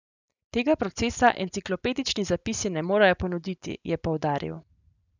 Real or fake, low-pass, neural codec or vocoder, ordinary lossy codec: real; 7.2 kHz; none; none